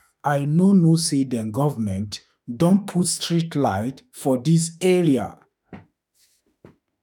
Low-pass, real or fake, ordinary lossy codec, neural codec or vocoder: 19.8 kHz; fake; none; autoencoder, 48 kHz, 32 numbers a frame, DAC-VAE, trained on Japanese speech